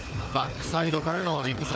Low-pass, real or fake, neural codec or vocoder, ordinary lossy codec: none; fake; codec, 16 kHz, 4 kbps, FunCodec, trained on Chinese and English, 50 frames a second; none